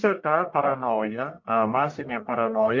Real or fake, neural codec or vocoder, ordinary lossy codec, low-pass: fake; codec, 44.1 kHz, 1.7 kbps, Pupu-Codec; MP3, 48 kbps; 7.2 kHz